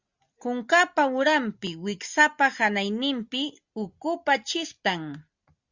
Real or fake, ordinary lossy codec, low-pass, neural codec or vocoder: real; Opus, 64 kbps; 7.2 kHz; none